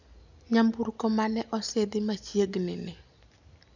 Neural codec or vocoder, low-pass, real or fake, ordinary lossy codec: none; 7.2 kHz; real; none